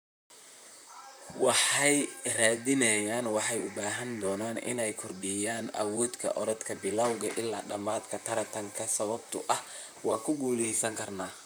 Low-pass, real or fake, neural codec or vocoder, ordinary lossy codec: none; fake; vocoder, 44.1 kHz, 128 mel bands, Pupu-Vocoder; none